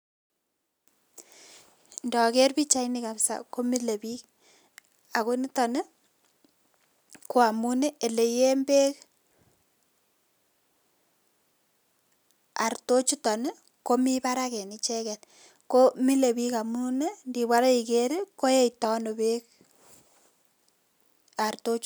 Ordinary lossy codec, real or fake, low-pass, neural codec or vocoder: none; real; none; none